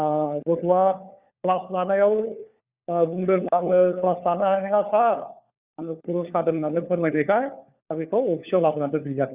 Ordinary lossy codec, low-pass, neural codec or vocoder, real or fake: Opus, 64 kbps; 3.6 kHz; codec, 16 kHz, 4 kbps, FunCodec, trained on LibriTTS, 50 frames a second; fake